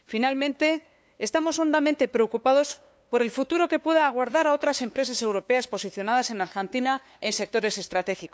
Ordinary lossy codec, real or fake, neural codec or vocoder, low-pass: none; fake; codec, 16 kHz, 2 kbps, FunCodec, trained on LibriTTS, 25 frames a second; none